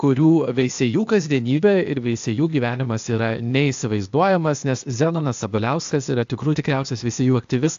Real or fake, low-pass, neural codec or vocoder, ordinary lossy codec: fake; 7.2 kHz; codec, 16 kHz, 0.8 kbps, ZipCodec; AAC, 64 kbps